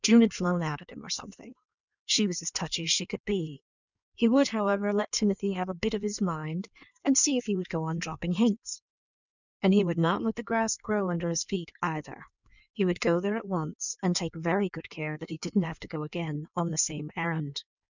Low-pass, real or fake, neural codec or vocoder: 7.2 kHz; fake; codec, 16 kHz in and 24 kHz out, 1.1 kbps, FireRedTTS-2 codec